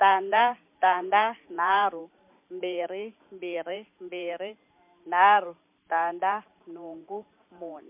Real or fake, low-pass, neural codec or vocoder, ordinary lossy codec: fake; 3.6 kHz; vocoder, 44.1 kHz, 128 mel bands every 512 samples, BigVGAN v2; MP3, 32 kbps